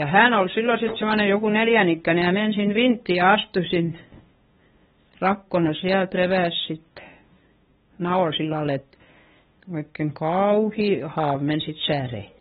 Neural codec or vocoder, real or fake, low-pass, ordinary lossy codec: codec, 16 kHz, 2 kbps, FunCodec, trained on LibriTTS, 25 frames a second; fake; 7.2 kHz; AAC, 16 kbps